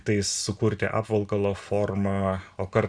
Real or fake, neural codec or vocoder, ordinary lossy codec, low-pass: real; none; Opus, 64 kbps; 9.9 kHz